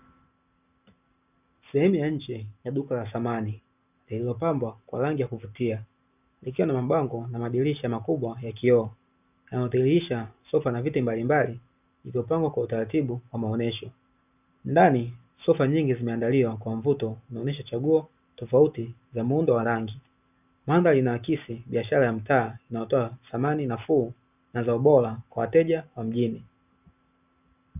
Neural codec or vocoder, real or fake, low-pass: none; real; 3.6 kHz